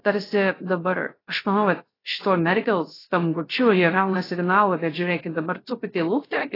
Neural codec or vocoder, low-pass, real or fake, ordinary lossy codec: codec, 16 kHz, 0.3 kbps, FocalCodec; 5.4 kHz; fake; AAC, 24 kbps